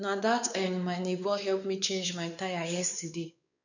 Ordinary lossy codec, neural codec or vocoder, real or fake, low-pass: none; codec, 16 kHz, 4 kbps, X-Codec, WavLM features, trained on Multilingual LibriSpeech; fake; 7.2 kHz